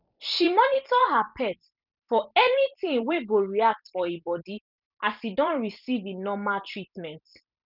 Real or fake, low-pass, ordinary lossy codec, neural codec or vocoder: fake; 5.4 kHz; none; vocoder, 44.1 kHz, 128 mel bands every 256 samples, BigVGAN v2